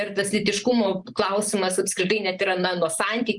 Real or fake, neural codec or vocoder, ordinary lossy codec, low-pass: real; none; Opus, 32 kbps; 10.8 kHz